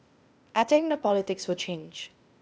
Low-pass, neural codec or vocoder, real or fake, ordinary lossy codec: none; codec, 16 kHz, 0.8 kbps, ZipCodec; fake; none